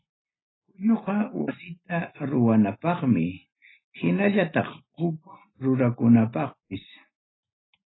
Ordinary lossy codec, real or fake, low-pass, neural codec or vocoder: AAC, 16 kbps; real; 7.2 kHz; none